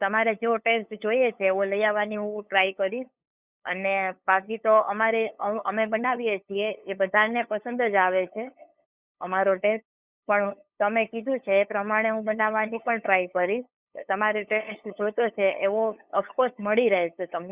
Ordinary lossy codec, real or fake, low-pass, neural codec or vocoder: Opus, 64 kbps; fake; 3.6 kHz; codec, 16 kHz, 8 kbps, FunCodec, trained on LibriTTS, 25 frames a second